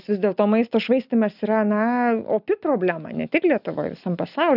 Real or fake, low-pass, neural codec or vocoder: real; 5.4 kHz; none